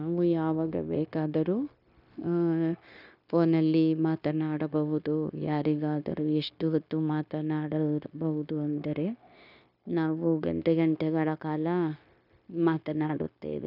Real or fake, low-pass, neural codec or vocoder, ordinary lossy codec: fake; 5.4 kHz; codec, 16 kHz, 0.9 kbps, LongCat-Audio-Codec; none